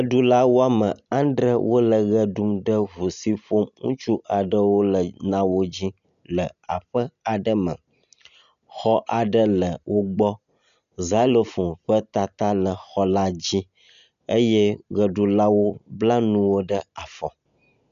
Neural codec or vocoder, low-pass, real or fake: none; 7.2 kHz; real